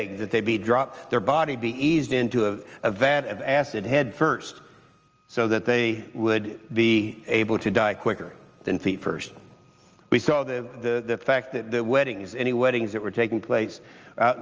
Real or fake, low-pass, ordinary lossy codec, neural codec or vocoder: real; 7.2 kHz; Opus, 24 kbps; none